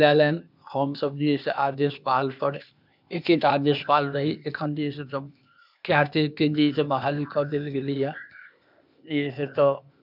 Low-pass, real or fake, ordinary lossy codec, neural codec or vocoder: 5.4 kHz; fake; none; codec, 16 kHz, 0.8 kbps, ZipCodec